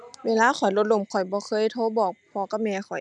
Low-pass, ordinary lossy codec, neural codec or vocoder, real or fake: 10.8 kHz; none; none; real